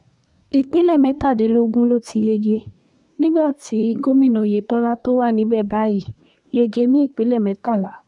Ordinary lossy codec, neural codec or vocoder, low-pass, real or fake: none; codec, 24 kHz, 1 kbps, SNAC; 10.8 kHz; fake